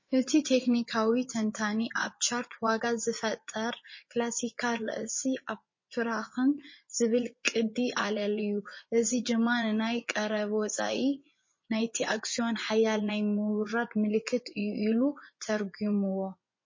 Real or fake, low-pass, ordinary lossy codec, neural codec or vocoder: fake; 7.2 kHz; MP3, 32 kbps; vocoder, 24 kHz, 100 mel bands, Vocos